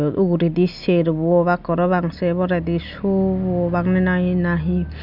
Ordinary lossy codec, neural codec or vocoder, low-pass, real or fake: none; none; 5.4 kHz; real